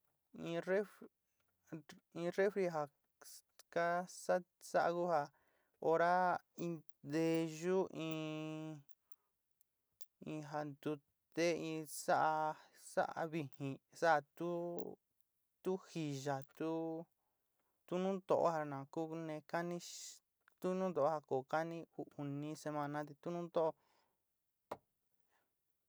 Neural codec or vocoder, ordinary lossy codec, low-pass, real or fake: none; none; none; real